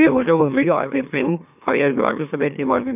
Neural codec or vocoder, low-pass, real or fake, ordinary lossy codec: autoencoder, 44.1 kHz, a latent of 192 numbers a frame, MeloTTS; 3.6 kHz; fake; none